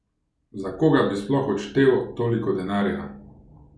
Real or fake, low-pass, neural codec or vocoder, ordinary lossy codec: real; none; none; none